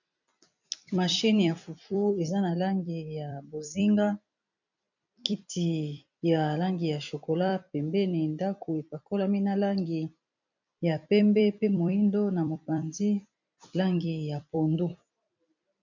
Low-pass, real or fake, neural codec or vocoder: 7.2 kHz; fake; vocoder, 44.1 kHz, 128 mel bands every 256 samples, BigVGAN v2